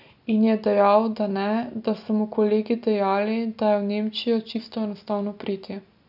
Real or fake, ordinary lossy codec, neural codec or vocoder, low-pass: real; none; none; 5.4 kHz